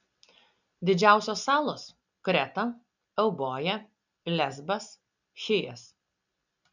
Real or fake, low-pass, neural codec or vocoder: real; 7.2 kHz; none